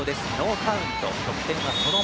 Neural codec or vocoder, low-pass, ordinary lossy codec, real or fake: none; none; none; real